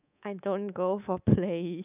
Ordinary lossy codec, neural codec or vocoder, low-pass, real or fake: none; codec, 24 kHz, 3.1 kbps, DualCodec; 3.6 kHz; fake